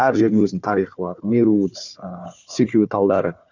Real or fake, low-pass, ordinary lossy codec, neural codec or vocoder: fake; 7.2 kHz; none; codec, 16 kHz, 4 kbps, FunCodec, trained on Chinese and English, 50 frames a second